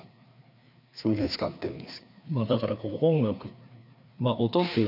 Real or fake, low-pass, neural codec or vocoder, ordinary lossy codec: fake; 5.4 kHz; codec, 16 kHz, 2 kbps, FreqCodec, larger model; none